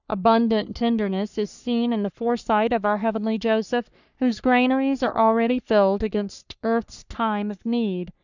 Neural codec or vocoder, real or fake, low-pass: codec, 44.1 kHz, 3.4 kbps, Pupu-Codec; fake; 7.2 kHz